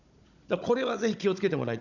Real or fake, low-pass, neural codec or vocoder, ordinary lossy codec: fake; 7.2 kHz; codec, 16 kHz, 16 kbps, FunCodec, trained on Chinese and English, 50 frames a second; none